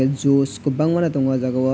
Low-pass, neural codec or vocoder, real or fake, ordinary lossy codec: none; none; real; none